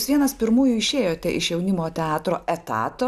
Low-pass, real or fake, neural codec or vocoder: 14.4 kHz; real; none